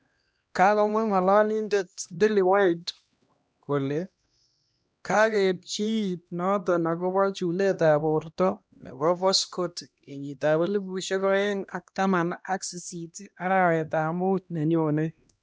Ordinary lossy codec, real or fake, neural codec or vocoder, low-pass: none; fake; codec, 16 kHz, 1 kbps, X-Codec, HuBERT features, trained on LibriSpeech; none